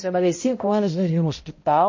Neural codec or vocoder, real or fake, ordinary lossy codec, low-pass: codec, 16 kHz, 0.5 kbps, X-Codec, HuBERT features, trained on balanced general audio; fake; MP3, 32 kbps; 7.2 kHz